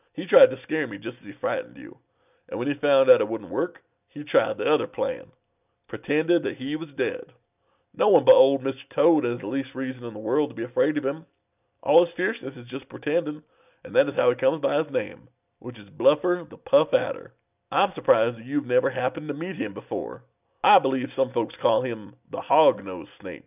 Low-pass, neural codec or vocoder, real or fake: 3.6 kHz; none; real